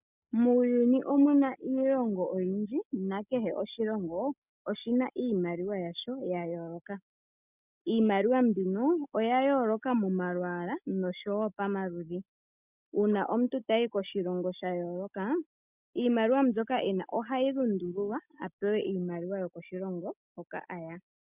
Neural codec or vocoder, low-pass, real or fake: none; 3.6 kHz; real